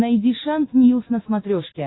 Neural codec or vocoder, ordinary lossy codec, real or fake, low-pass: none; AAC, 16 kbps; real; 7.2 kHz